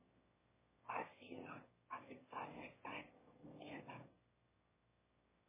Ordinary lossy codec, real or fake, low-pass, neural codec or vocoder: MP3, 16 kbps; fake; 3.6 kHz; autoencoder, 22.05 kHz, a latent of 192 numbers a frame, VITS, trained on one speaker